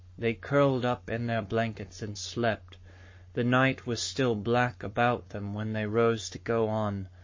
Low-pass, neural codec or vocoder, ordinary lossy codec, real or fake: 7.2 kHz; codec, 44.1 kHz, 7.8 kbps, Pupu-Codec; MP3, 32 kbps; fake